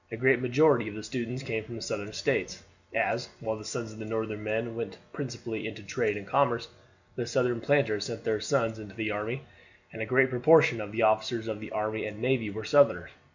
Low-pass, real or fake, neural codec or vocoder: 7.2 kHz; real; none